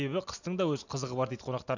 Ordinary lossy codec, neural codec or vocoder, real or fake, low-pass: none; none; real; 7.2 kHz